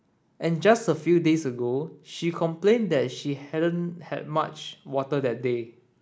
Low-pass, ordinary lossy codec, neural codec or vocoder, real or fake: none; none; none; real